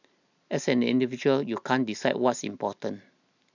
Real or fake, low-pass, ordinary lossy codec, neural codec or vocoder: real; 7.2 kHz; none; none